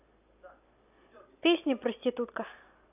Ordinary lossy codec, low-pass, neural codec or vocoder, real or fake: AAC, 32 kbps; 3.6 kHz; none; real